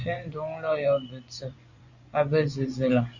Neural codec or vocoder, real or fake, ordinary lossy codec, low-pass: vocoder, 24 kHz, 100 mel bands, Vocos; fake; Opus, 64 kbps; 7.2 kHz